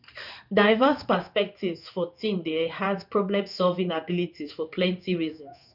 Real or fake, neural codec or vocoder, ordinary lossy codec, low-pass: fake; codec, 16 kHz in and 24 kHz out, 1 kbps, XY-Tokenizer; none; 5.4 kHz